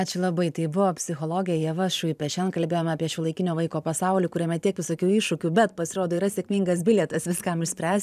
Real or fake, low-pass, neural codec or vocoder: fake; 14.4 kHz; vocoder, 44.1 kHz, 128 mel bands every 512 samples, BigVGAN v2